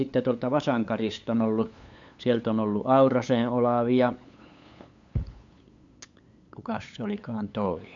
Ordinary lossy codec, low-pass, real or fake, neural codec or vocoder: MP3, 64 kbps; 7.2 kHz; fake; codec, 16 kHz, 8 kbps, FunCodec, trained on LibriTTS, 25 frames a second